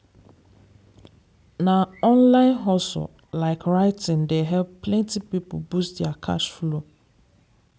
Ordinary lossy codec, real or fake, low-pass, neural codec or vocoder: none; real; none; none